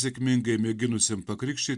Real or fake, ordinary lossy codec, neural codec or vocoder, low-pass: real; Opus, 64 kbps; none; 10.8 kHz